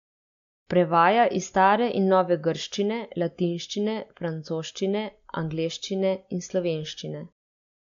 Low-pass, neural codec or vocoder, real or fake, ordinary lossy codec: 7.2 kHz; none; real; MP3, 64 kbps